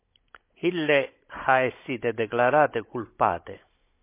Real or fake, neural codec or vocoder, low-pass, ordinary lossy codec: fake; codec, 16 kHz, 16 kbps, FunCodec, trained on Chinese and English, 50 frames a second; 3.6 kHz; MP3, 24 kbps